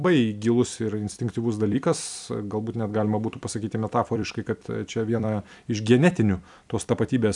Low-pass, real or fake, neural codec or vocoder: 10.8 kHz; fake; vocoder, 44.1 kHz, 128 mel bands every 256 samples, BigVGAN v2